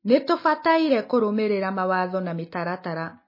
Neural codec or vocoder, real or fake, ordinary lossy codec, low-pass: none; real; MP3, 24 kbps; 5.4 kHz